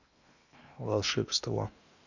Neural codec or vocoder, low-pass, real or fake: codec, 16 kHz in and 24 kHz out, 0.8 kbps, FocalCodec, streaming, 65536 codes; 7.2 kHz; fake